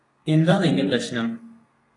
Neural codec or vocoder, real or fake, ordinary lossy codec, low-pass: codec, 32 kHz, 1.9 kbps, SNAC; fake; AAC, 32 kbps; 10.8 kHz